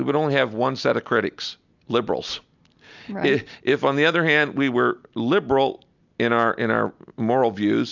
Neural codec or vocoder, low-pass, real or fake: none; 7.2 kHz; real